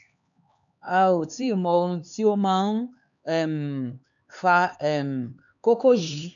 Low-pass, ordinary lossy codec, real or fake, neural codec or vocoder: 7.2 kHz; none; fake; codec, 16 kHz, 4 kbps, X-Codec, HuBERT features, trained on LibriSpeech